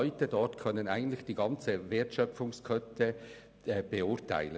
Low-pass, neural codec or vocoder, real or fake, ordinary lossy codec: none; none; real; none